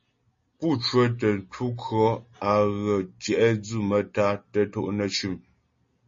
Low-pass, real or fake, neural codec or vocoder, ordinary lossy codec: 7.2 kHz; real; none; MP3, 32 kbps